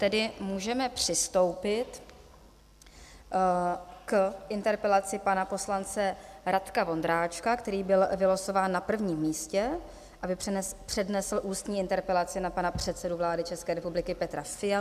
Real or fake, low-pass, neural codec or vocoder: real; 14.4 kHz; none